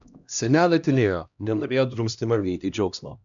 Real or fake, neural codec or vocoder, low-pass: fake; codec, 16 kHz, 1 kbps, X-Codec, HuBERT features, trained on LibriSpeech; 7.2 kHz